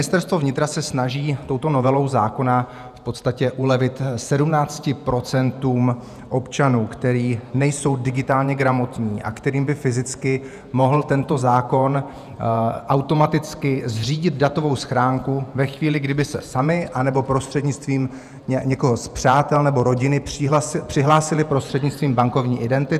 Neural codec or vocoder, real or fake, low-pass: vocoder, 48 kHz, 128 mel bands, Vocos; fake; 14.4 kHz